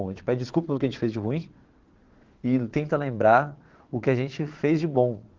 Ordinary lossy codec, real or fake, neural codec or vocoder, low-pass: Opus, 16 kbps; fake; vocoder, 22.05 kHz, 80 mel bands, Vocos; 7.2 kHz